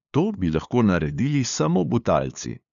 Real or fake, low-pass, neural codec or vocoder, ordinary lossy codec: fake; 7.2 kHz; codec, 16 kHz, 2 kbps, FunCodec, trained on LibriTTS, 25 frames a second; none